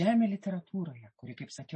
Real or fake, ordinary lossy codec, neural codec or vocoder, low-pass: real; MP3, 32 kbps; none; 10.8 kHz